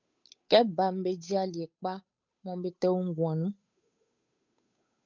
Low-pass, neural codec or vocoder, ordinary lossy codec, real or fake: 7.2 kHz; codec, 16 kHz, 8 kbps, FunCodec, trained on Chinese and English, 25 frames a second; MP3, 64 kbps; fake